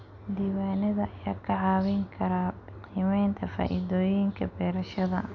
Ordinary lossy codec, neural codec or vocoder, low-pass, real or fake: none; none; 7.2 kHz; real